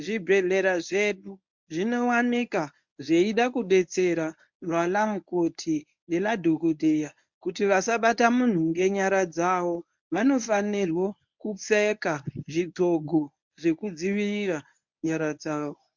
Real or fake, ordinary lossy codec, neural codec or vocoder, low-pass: fake; MP3, 64 kbps; codec, 24 kHz, 0.9 kbps, WavTokenizer, medium speech release version 1; 7.2 kHz